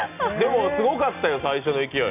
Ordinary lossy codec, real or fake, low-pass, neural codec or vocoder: none; real; 3.6 kHz; none